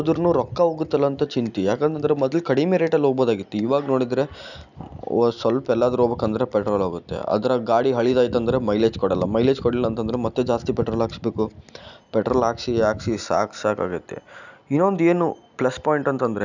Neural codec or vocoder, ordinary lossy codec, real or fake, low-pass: none; none; real; 7.2 kHz